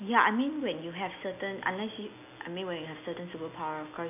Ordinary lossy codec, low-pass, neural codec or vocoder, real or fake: none; 3.6 kHz; none; real